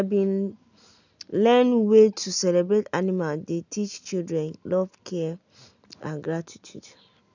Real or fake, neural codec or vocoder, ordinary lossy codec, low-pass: real; none; none; 7.2 kHz